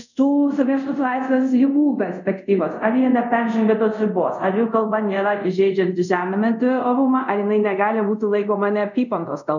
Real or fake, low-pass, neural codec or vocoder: fake; 7.2 kHz; codec, 24 kHz, 0.5 kbps, DualCodec